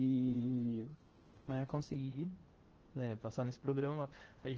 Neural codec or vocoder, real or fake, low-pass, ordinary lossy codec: codec, 16 kHz in and 24 kHz out, 0.8 kbps, FocalCodec, streaming, 65536 codes; fake; 7.2 kHz; Opus, 16 kbps